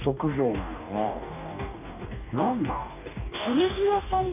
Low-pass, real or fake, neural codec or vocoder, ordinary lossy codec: 3.6 kHz; fake; codec, 44.1 kHz, 2.6 kbps, DAC; none